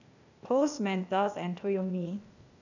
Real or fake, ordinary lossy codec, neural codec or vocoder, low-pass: fake; none; codec, 16 kHz, 0.8 kbps, ZipCodec; 7.2 kHz